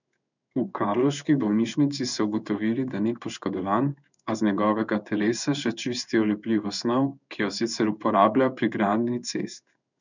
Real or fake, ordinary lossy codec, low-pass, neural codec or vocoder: fake; none; 7.2 kHz; codec, 16 kHz in and 24 kHz out, 1 kbps, XY-Tokenizer